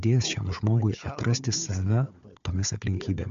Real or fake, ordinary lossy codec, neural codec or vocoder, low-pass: fake; MP3, 64 kbps; codec, 16 kHz, 4 kbps, FunCodec, trained on Chinese and English, 50 frames a second; 7.2 kHz